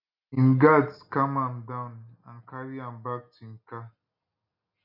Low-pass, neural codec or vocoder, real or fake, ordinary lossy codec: 5.4 kHz; none; real; none